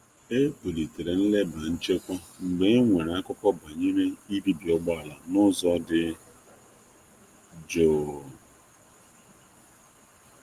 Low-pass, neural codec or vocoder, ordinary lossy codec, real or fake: 14.4 kHz; none; Opus, 24 kbps; real